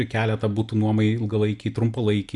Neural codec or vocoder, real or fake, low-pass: none; real; 10.8 kHz